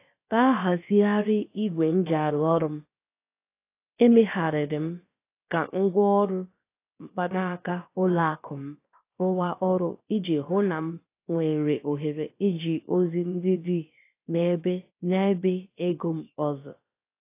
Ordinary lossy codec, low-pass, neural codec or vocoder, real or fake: AAC, 24 kbps; 3.6 kHz; codec, 16 kHz, about 1 kbps, DyCAST, with the encoder's durations; fake